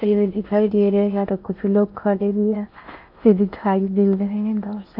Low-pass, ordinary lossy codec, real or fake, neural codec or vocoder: 5.4 kHz; none; fake; codec, 16 kHz in and 24 kHz out, 0.6 kbps, FocalCodec, streaming, 4096 codes